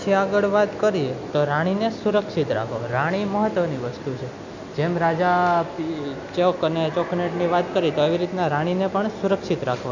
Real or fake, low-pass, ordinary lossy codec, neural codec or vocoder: real; 7.2 kHz; none; none